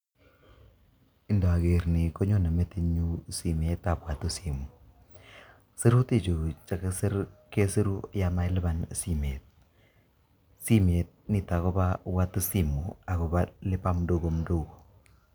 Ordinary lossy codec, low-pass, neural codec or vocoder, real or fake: none; none; none; real